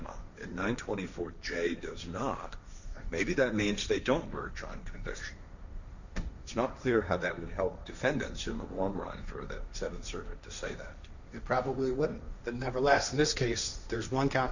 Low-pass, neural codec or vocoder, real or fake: 7.2 kHz; codec, 16 kHz, 1.1 kbps, Voila-Tokenizer; fake